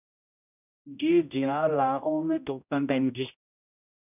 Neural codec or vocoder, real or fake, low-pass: codec, 16 kHz, 0.5 kbps, X-Codec, HuBERT features, trained on general audio; fake; 3.6 kHz